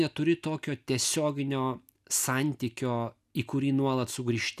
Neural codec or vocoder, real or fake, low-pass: none; real; 14.4 kHz